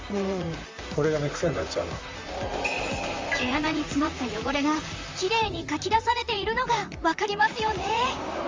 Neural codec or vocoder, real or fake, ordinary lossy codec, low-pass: vocoder, 44.1 kHz, 128 mel bands, Pupu-Vocoder; fake; Opus, 32 kbps; 7.2 kHz